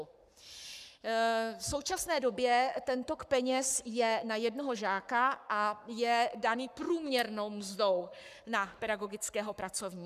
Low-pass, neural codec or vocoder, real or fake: 14.4 kHz; codec, 44.1 kHz, 7.8 kbps, Pupu-Codec; fake